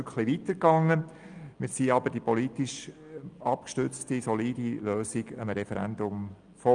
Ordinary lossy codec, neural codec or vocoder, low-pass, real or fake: Opus, 32 kbps; none; 9.9 kHz; real